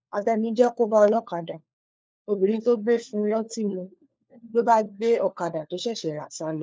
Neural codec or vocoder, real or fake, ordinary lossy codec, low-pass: codec, 16 kHz, 4 kbps, FunCodec, trained on LibriTTS, 50 frames a second; fake; none; none